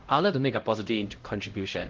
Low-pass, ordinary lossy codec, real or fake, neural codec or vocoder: 7.2 kHz; Opus, 16 kbps; fake; codec, 16 kHz, 0.5 kbps, X-Codec, HuBERT features, trained on LibriSpeech